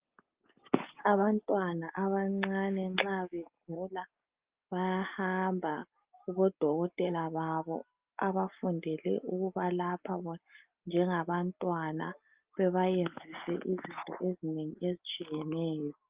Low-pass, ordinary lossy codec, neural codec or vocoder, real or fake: 3.6 kHz; Opus, 24 kbps; none; real